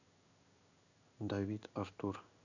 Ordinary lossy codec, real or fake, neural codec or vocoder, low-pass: none; fake; codec, 16 kHz in and 24 kHz out, 1 kbps, XY-Tokenizer; 7.2 kHz